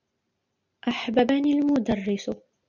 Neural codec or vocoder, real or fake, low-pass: none; real; 7.2 kHz